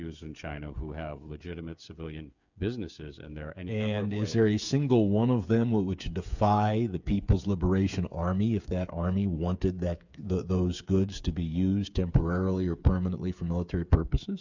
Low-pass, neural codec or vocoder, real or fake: 7.2 kHz; codec, 16 kHz, 8 kbps, FreqCodec, smaller model; fake